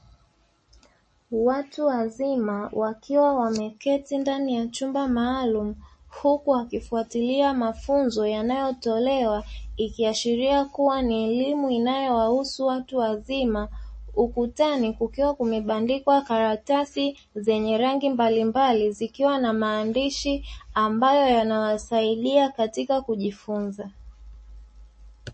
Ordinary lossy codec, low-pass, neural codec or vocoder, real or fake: MP3, 32 kbps; 9.9 kHz; none; real